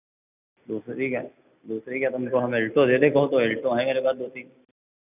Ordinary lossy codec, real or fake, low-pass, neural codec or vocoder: none; real; 3.6 kHz; none